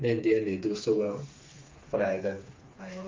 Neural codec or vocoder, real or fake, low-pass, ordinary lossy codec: codec, 16 kHz, 4 kbps, FreqCodec, smaller model; fake; 7.2 kHz; Opus, 32 kbps